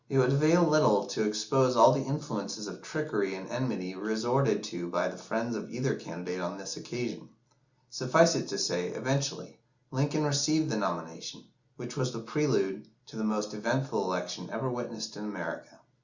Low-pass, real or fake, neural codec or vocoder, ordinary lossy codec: 7.2 kHz; real; none; Opus, 64 kbps